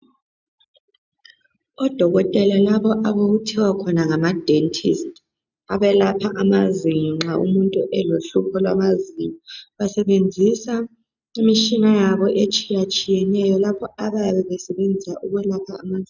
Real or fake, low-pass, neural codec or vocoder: real; 7.2 kHz; none